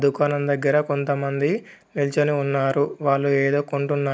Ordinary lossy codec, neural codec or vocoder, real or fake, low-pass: none; none; real; none